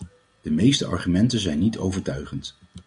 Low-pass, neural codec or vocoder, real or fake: 9.9 kHz; none; real